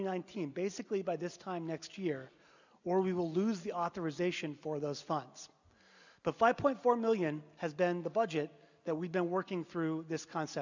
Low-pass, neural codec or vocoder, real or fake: 7.2 kHz; none; real